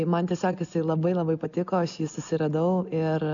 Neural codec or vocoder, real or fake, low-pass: none; real; 7.2 kHz